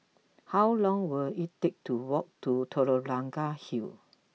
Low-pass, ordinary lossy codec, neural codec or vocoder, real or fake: none; none; none; real